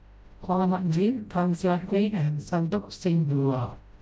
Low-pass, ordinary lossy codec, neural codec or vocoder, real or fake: none; none; codec, 16 kHz, 0.5 kbps, FreqCodec, smaller model; fake